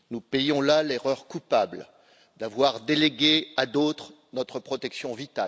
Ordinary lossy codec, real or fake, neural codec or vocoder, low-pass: none; real; none; none